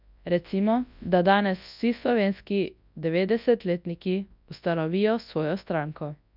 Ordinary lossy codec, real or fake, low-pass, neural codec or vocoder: none; fake; 5.4 kHz; codec, 24 kHz, 0.9 kbps, WavTokenizer, large speech release